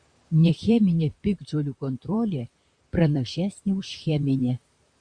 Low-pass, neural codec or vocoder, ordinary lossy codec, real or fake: 9.9 kHz; vocoder, 44.1 kHz, 128 mel bands, Pupu-Vocoder; Opus, 64 kbps; fake